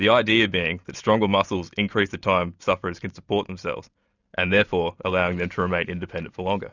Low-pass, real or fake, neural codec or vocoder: 7.2 kHz; real; none